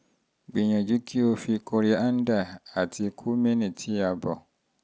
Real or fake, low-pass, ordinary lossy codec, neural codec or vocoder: real; none; none; none